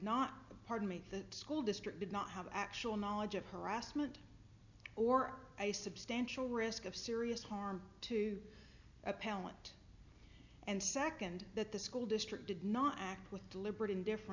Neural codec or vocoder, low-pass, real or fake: none; 7.2 kHz; real